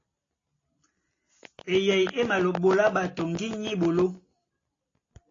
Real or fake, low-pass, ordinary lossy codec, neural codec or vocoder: real; 7.2 kHz; AAC, 32 kbps; none